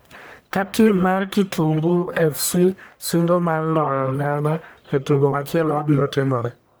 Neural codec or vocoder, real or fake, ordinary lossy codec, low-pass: codec, 44.1 kHz, 1.7 kbps, Pupu-Codec; fake; none; none